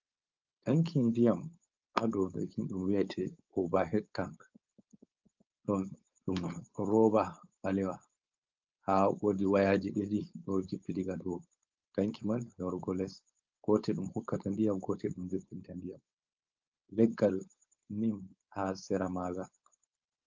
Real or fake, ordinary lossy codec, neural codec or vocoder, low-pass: fake; Opus, 24 kbps; codec, 16 kHz, 4.8 kbps, FACodec; 7.2 kHz